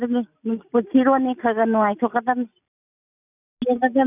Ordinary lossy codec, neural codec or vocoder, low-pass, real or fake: none; none; 3.6 kHz; real